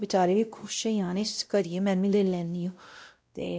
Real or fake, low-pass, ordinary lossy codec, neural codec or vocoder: fake; none; none; codec, 16 kHz, 0.5 kbps, X-Codec, WavLM features, trained on Multilingual LibriSpeech